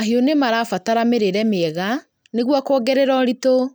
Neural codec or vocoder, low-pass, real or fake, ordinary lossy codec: none; none; real; none